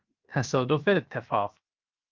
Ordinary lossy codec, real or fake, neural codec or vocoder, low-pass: Opus, 24 kbps; fake; codec, 16 kHz, 0.7 kbps, FocalCodec; 7.2 kHz